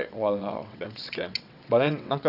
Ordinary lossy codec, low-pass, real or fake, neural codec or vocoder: none; 5.4 kHz; fake; vocoder, 22.05 kHz, 80 mel bands, WaveNeXt